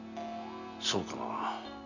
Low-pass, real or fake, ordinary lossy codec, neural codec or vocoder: 7.2 kHz; real; none; none